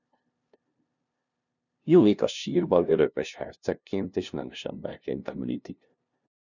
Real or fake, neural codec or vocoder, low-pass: fake; codec, 16 kHz, 0.5 kbps, FunCodec, trained on LibriTTS, 25 frames a second; 7.2 kHz